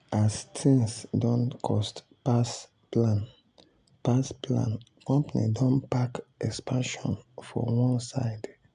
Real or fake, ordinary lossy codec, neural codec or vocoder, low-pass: real; AAC, 96 kbps; none; 9.9 kHz